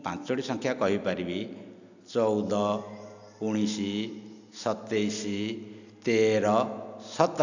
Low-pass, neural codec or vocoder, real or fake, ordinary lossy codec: 7.2 kHz; none; real; none